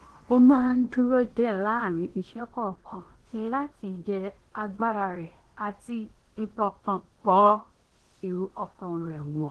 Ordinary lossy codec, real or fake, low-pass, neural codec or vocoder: Opus, 16 kbps; fake; 10.8 kHz; codec, 16 kHz in and 24 kHz out, 0.8 kbps, FocalCodec, streaming, 65536 codes